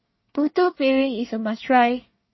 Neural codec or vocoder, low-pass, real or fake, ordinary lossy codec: codec, 24 kHz, 1 kbps, SNAC; 7.2 kHz; fake; MP3, 24 kbps